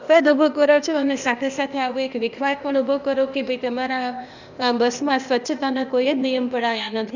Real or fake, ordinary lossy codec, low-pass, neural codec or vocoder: fake; none; 7.2 kHz; codec, 16 kHz, 0.8 kbps, ZipCodec